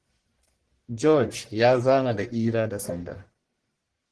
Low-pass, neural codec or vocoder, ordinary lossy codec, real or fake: 10.8 kHz; codec, 44.1 kHz, 1.7 kbps, Pupu-Codec; Opus, 16 kbps; fake